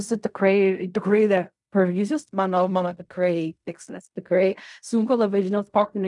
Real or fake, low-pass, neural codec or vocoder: fake; 10.8 kHz; codec, 16 kHz in and 24 kHz out, 0.4 kbps, LongCat-Audio-Codec, fine tuned four codebook decoder